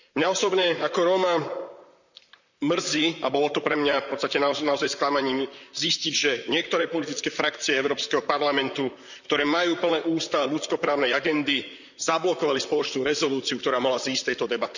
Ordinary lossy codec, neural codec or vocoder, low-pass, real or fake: none; vocoder, 44.1 kHz, 128 mel bands, Pupu-Vocoder; 7.2 kHz; fake